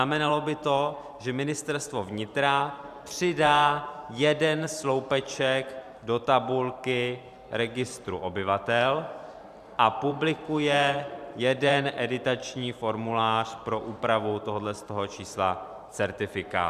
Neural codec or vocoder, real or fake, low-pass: vocoder, 44.1 kHz, 128 mel bands every 512 samples, BigVGAN v2; fake; 14.4 kHz